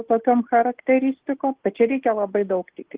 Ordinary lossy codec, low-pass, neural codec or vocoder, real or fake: Opus, 16 kbps; 3.6 kHz; none; real